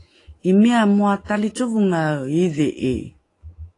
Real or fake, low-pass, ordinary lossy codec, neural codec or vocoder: fake; 10.8 kHz; AAC, 32 kbps; autoencoder, 48 kHz, 128 numbers a frame, DAC-VAE, trained on Japanese speech